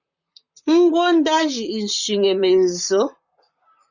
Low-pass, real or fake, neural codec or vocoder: 7.2 kHz; fake; vocoder, 44.1 kHz, 128 mel bands, Pupu-Vocoder